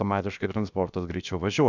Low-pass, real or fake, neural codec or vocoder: 7.2 kHz; fake; codec, 16 kHz, 0.7 kbps, FocalCodec